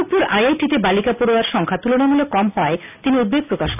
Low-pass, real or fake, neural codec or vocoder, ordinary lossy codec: 3.6 kHz; real; none; none